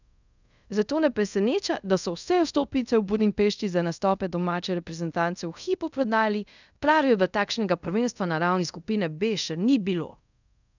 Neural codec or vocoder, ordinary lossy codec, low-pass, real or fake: codec, 24 kHz, 0.5 kbps, DualCodec; none; 7.2 kHz; fake